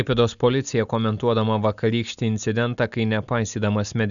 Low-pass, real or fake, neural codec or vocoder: 7.2 kHz; real; none